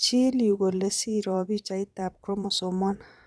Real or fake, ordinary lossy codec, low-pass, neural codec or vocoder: fake; none; none; vocoder, 22.05 kHz, 80 mel bands, Vocos